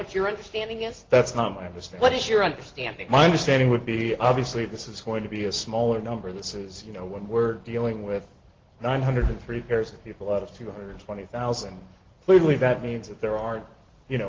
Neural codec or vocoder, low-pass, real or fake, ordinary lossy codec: none; 7.2 kHz; real; Opus, 16 kbps